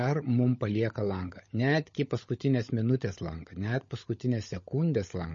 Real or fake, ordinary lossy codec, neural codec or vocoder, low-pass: fake; MP3, 32 kbps; codec, 16 kHz, 16 kbps, FunCodec, trained on LibriTTS, 50 frames a second; 7.2 kHz